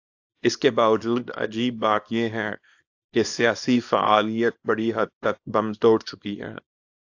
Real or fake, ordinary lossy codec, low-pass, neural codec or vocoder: fake; AAC, 48 kbps; 7.2 kHz; codec, 24 kHz, 0.9 kbps, WavTokenizer, small release